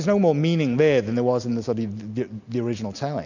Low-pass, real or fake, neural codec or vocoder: 7.2 kHz; real; none